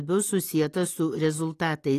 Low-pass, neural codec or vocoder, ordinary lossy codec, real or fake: 14.4 kHz; none; AAC, 48 kbps; real